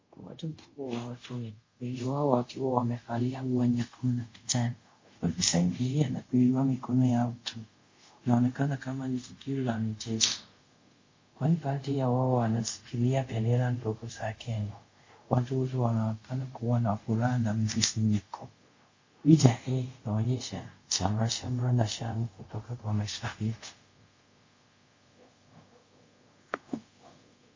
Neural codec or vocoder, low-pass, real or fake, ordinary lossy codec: codec, 24 kHz, 0.5 kbps, DualCodec; 7.2 kHz; fake; MP3, 32 kbps